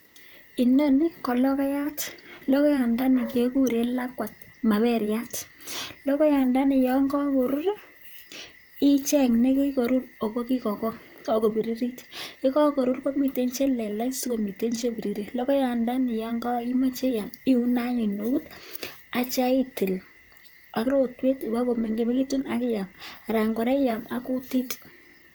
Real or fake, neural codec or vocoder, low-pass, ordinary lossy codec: fake; vocoder, 44.1 kHz, 128 mel bands, Pupu-Vocoder; none; none